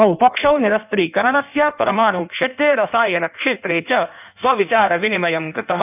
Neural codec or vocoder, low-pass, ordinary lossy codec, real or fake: codec, 16 kHz in and 24 kHz out, 1.1 kbps, FireRedTTS-2 codec; 3.6 kHz; none; fake